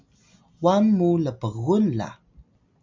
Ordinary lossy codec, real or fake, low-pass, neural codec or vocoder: MP3, 64 kbps; real; 7.2 kHz; none